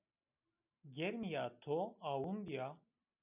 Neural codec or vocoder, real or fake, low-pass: none; real; 3.6 kHz